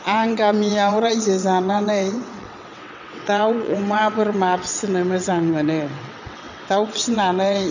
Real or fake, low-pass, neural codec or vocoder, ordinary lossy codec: fake; 7.2 kHz; vocoder, 22.05 kHz, 80 mel bands, WaveNeXt; none